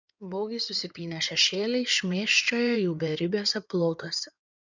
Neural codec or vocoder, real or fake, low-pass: codec, 16 kHz in and 24 kHz out, 2.2 kbps, FireRedTTS-2 codec; fake; 7.2 kHz